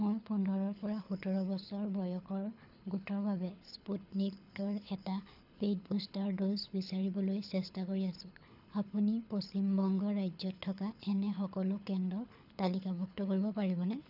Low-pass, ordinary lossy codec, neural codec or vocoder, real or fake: 5.4 kHz; none; codec, 24 kHz, 6 kbps, HILCodec; fake